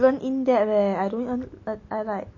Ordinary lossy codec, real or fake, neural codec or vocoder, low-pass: MP3, 32 kbps; real; none; 7.2 kHz